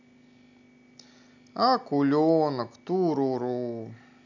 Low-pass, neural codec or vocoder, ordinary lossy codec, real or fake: 7.2 kHz; none; none; real